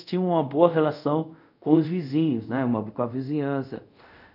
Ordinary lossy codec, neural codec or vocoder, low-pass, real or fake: none; codec, 24 kHz, 0.5 kbps, DualCodec; 5.4 kHz; fake